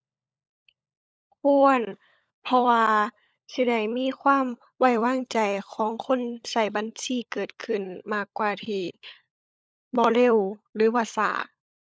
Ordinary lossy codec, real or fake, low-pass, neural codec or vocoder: none; fake; none; codec, 16 kHz, 16 kbps, FunCodec, trained on LibriTTS, 50 frames a second